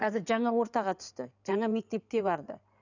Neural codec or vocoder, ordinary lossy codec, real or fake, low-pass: codec, 16 kHz in and 24 kHz out, 2.2 kbps, FireRedTTS-2 codec; none; fake; 7.2 kHz